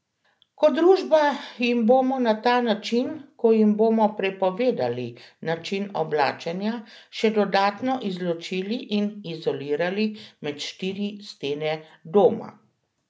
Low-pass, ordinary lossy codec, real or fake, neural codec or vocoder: none; none; real; none